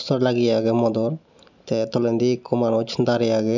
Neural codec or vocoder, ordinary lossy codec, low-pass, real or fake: none; none; 7.2 kHz; real